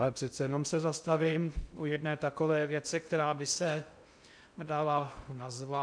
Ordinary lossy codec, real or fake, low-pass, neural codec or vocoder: MP3, 96 kbps; fake; 9.9 kHz; codec, 16 kHz in and 24 kHz out, 0.6 kbps, FocalCodec, streaming, 2048 codes